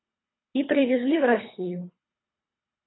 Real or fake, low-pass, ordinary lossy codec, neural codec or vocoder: fake; 7.2 kHz; AAC, 16 kbps; codec, 24 kHz, 3 kbps, HILCodec